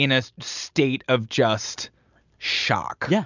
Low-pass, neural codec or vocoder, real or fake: 7.2 kHz; none; real